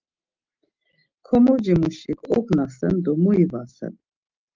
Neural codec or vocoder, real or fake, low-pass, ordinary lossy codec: none; real; 7.2 kHz; Opus, 24 kbps